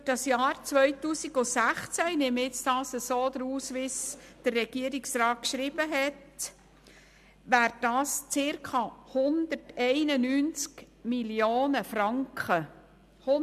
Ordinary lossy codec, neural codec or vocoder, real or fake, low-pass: MP3, 96 kbps; none; real; 14.4 kHz